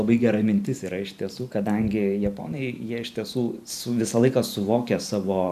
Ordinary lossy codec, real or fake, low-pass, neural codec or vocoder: MP3, 96 kbps; fake; 14.4 kHz; vocoder, 44.1 kHz, 128 mel bands every 512 samples, BigVGAN v2